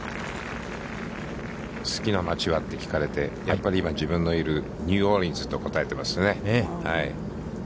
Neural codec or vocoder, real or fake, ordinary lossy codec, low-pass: none; real; none; none